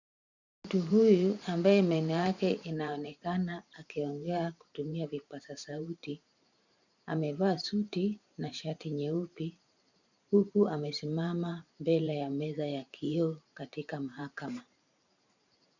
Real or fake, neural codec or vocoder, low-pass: fake; vocoder, 44.1 kHz, 128 mel bands every 512 samples, BigVGAN v2; 7.2 kHz